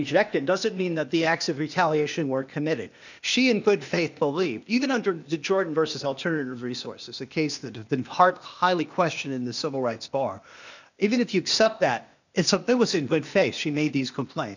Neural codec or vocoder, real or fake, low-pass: codec, 16 kHz, 0.8 kbps, ZipCodec; fake; 7.2 kHz